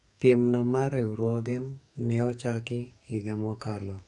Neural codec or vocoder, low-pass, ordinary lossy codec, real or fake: codec, 44.1 kHz, 2.6 kbps, SNAC; 10.8 kHz; none; fake